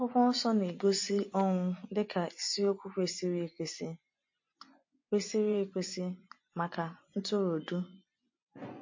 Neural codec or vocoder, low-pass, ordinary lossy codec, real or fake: vocoder, 24 kHz, 100 mel bands, Vocos; 7.2 kHz; MP3, 32 kbps; fake